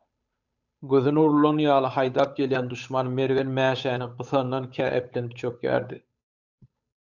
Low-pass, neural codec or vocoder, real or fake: 7.2 kHz; codec, 16 kHz, 8 kbps, FunCodec, trained on Chinese and English, 25 frames a second; fake